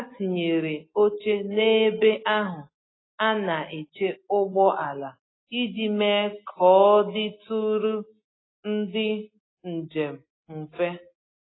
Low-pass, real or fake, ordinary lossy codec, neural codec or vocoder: 7.2 kHz; real; AAC, 16 kbps; none